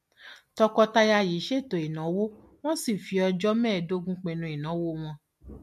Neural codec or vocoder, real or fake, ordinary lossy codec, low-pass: none; real; MP3, 64 kbps; 14.4 kHz